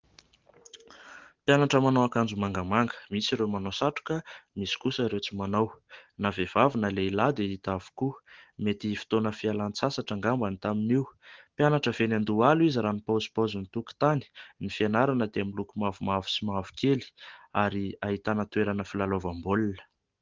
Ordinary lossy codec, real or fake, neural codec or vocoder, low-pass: Opus, 16 kbps; real; none; 7.2 kHz